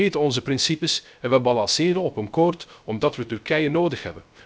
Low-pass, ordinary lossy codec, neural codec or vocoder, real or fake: none; none; codec, 16 kHz, 0.3 kbps, FocalCodec; fake